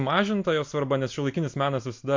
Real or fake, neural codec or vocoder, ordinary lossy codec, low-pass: real; none; MP3, 48 kbps; 7.2 kHz